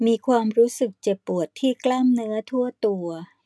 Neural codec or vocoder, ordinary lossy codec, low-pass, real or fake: none; none; none; real